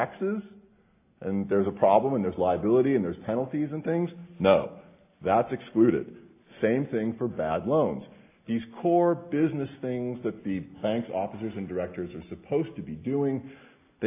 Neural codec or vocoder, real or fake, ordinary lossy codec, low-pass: none; real; AAC, 24 kbps; 3.6 kHz